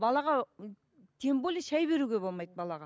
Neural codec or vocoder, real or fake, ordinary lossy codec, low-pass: none; real; none; none